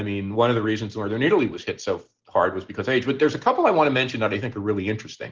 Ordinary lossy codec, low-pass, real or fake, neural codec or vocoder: Opus, 16 kbps; 7.2 kHz; real; none